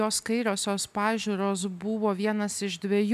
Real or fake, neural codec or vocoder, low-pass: real; none; 14.4 kHz